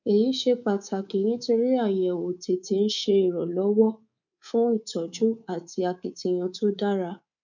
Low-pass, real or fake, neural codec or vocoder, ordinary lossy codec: 7.2 kHz; fake; codec, 24 kHz, 3.1 kbps, DualCodec; none